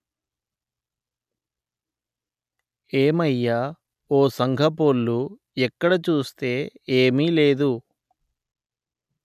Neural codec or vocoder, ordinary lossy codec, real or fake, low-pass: none; none; real; 14.4 kHz